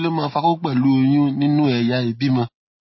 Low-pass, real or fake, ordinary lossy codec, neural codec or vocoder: 7.2 kHz; real; MP3, 24 kbps; none